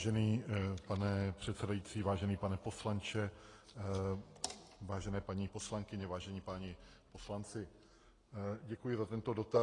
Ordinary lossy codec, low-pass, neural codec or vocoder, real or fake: AAC, 32 kbps; 10.8 kHz; none; real